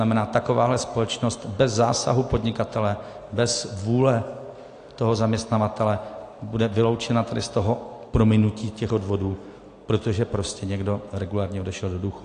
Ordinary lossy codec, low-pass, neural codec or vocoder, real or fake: AAC, 48 kbps; 9.9 kHz; none; real